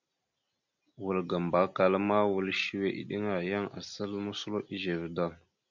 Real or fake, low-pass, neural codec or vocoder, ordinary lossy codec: real; 7.2 kHz; none; MP3, 64 kbps